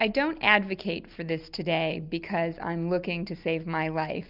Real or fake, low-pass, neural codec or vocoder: real; 5.4 kHz; none